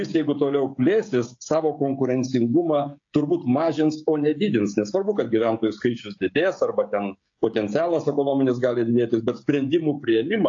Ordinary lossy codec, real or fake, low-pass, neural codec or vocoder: AAC, 48 kbps; fake; 7.2 kHz; codec, 16 kHz, 16 kbps, FreqCodec, smaller model